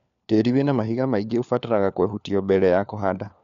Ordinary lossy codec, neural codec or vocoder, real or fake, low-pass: MP3, 96 kbps; codec, 16 kHz, 4 kbps, FunCodec, trained on LibriTTS, 50 frames a second; fake; 7.2 kHz